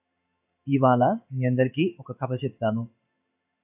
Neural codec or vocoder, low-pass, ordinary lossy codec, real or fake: codec, 16 kHz in and 24 kHz out, 1 kbps, XY-Tokenizer; 3.6 kHz; AAC, 32 kbps; fake